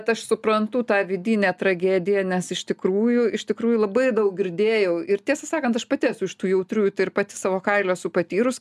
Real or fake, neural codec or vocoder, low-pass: real; none; 14.4 kHz